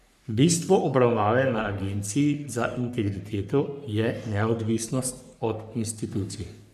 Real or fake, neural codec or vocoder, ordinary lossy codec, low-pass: fake; codec, 44.1 kHz, 3.4 kbps, Pupu-Codec; none; 14.4 kHz